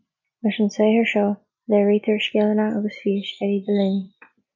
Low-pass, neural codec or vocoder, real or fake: 7.2 kHz; none; real